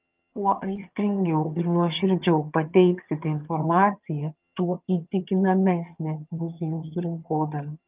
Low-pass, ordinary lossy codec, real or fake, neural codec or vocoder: 3.6 kHz; Opus, 24 kbps; fake; vocoder, 22.05 kHz, 80 mel bands, HiFi-GAN